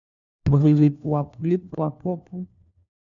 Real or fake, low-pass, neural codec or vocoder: fake; 7.2 kHz; codec, 16 kHz, 1 kbps, FunCodec, trained on LibriTTS, 50 frames a second